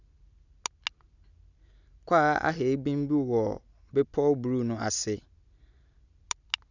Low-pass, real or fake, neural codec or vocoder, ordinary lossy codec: 7.2 kHz; real; none; none